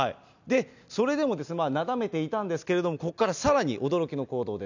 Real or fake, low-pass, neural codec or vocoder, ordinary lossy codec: real; 7.2 kHz; none; none